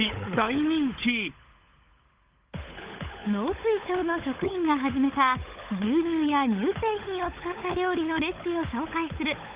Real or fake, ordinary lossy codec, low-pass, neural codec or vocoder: fake; Opus, 32 kbps; 3.6 kHz; codec, 16 kHz, 16 kbps, FunCodec, trained on LibriTTS, 50 frames a second